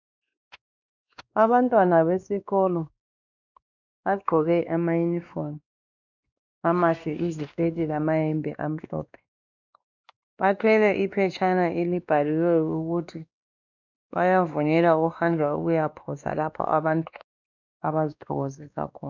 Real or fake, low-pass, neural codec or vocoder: fake; 7.2 kHz; codec, 16 kHz, 2 kbps, X-Codec, WavLM features, trained on Multilingual LibriSpeech